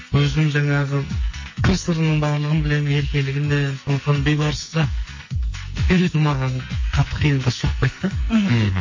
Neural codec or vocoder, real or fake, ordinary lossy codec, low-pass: codec, 44.1 kHz, 2.6 kbps, SNAC; fake; MP3, 32 kbps; 7.2 kHz